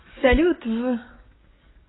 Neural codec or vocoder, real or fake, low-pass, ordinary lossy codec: codec, 44.1 kHz, 7.8 kbps, DAC; fake; 7.2 kHz; AAC, 16 kbps